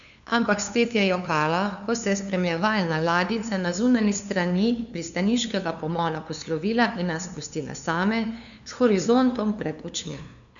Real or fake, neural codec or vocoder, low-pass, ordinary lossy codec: fake; codec, 16 kHz, 2 kbps, FunCodec, trained on LibriTTS, 25 frames a second; 7.2 kHz; none